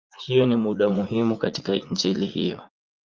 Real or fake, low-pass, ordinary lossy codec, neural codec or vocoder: fake; 7.2 kHz; Opus, 32 kbps; vocoder, 44.1 kHz, 80 mel bands, Vocos